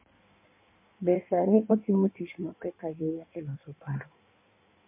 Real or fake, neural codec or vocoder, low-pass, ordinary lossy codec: fake; codec, 16 kHz in and 24 kHz out, 1.1 kbps, FireRedTTS-2 codec; 3.6 kHz; MP3, 32 kbps